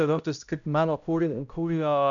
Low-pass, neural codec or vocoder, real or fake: 7.2 kHz; codec, 16 kHz, 0.5 kbps, X-Codec, HuBERT features, trained on balanced general audio; fake